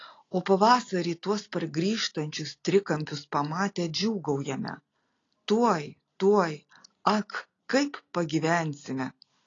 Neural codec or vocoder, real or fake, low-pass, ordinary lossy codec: none; real; 7.2 kHz; AAC, 32 kbps